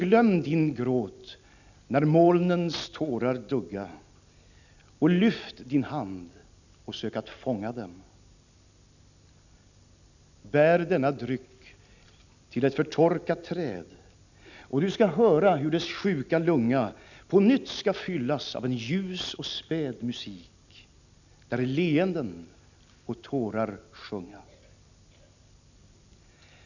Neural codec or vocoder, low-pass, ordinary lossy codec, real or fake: none; 7.2 kHz; none; real